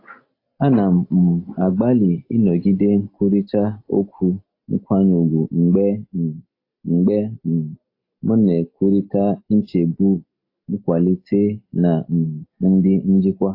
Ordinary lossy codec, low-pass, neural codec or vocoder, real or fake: AAC, 32 kbps; 5.4 kHz; none; real